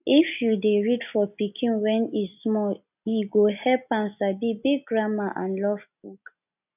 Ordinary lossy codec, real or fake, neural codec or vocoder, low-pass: none; real; none; 3.6 kHz